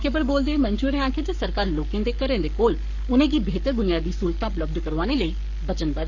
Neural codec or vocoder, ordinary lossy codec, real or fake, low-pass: codec, 44.1 kHz, 7.8 kbps, Pupu-Codec; none; fake; 7.2 kHz